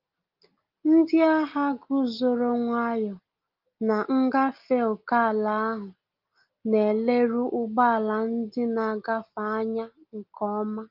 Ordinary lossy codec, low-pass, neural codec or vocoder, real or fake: Opus, 32 kbps; 5.4 kHz; none; real